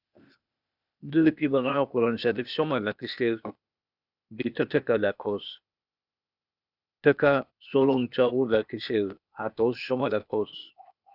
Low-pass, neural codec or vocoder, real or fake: 5.4 kHz; codec, 16 kHz, 0.8 kbps, ZipCodec; fake